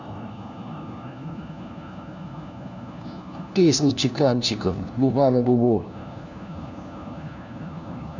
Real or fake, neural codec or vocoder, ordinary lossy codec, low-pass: fake; codec, 16 kHz, 1 kbps, FunCodec, trained on LibriTTS, 50 frames a second; none; 7.2 kHz